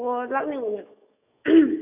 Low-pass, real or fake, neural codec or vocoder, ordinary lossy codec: 3.6 kHz; fake; autoencoder, 48 kHz, 128 numbers a frame, DAC-VAE, trained on Japanese speech; none